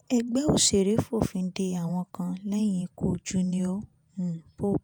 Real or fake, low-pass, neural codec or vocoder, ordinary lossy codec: fake; none; vocoder, 48 kHz, 128 mel bands, Vocos; none